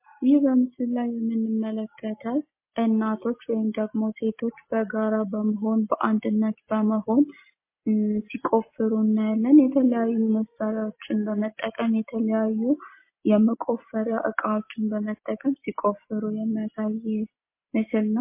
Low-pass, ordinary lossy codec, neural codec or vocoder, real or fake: 3.6 kHz; MP3, 24 kbps; none; real